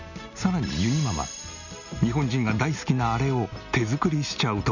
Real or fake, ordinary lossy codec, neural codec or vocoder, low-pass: real; none; none; 7.2 kHz